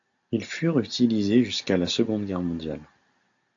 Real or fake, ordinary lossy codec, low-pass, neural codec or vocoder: real; AAC, 64 kbps; 7.2 kHz; none